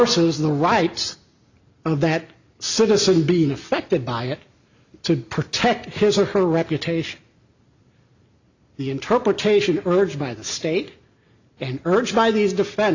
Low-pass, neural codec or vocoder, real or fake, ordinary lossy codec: 7.2 kHz; none; real; Opus, 64 kbps